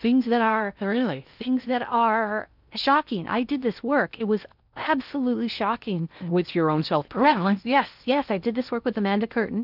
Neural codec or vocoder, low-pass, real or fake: codec, 16 kHz in and 24 kHz out, 0.8 kbps, FocalCodec, streaming, 65536 codes; 5.4 kHz; fake